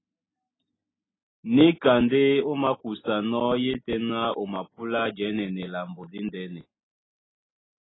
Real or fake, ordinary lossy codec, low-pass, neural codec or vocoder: real; AAC, 16 kbps; 7.2 kHz; none